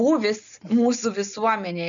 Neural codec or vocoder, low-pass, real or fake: none; 7.2 kHz; real